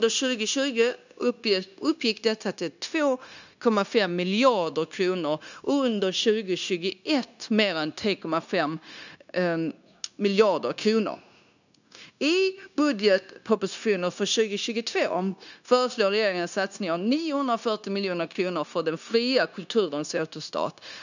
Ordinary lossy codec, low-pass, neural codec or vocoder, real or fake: none; 7.2 kHz; codec, 16 kHz, 0.9 kbps, LongCat-Audio-Codec; fake